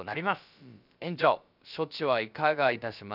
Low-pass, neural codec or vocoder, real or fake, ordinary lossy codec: 5.4 kHz; codec, 16 kHz, about 1 kbps, DyCAST, with the encoder's durations; fake; none